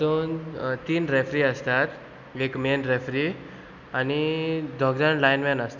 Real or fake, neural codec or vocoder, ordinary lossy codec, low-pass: real; none; none; 7.2 kHz